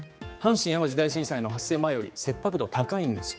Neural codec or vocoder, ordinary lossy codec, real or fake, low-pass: codec, 16 kHz, 4 kbps, X-Codec, HuBERT features, trained on general audio; none; fake; none